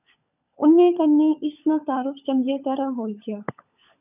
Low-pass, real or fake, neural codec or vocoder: 3.6 kHz; fake; codec, 16 kHz, 16 kbps, FunCodec, trained on LibriTTS, 50 frames a second